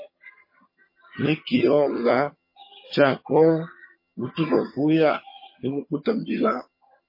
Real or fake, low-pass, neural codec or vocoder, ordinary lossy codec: fake; 5.4 kHz; vocoder, 22.05 kHz, 80 mel bands, HiFi-GAN; MP3, 24 kbps